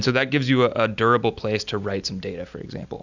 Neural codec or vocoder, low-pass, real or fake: none; 7.2 kHz; real